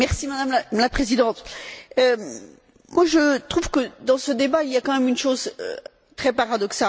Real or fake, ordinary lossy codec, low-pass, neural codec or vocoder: real; none; none; none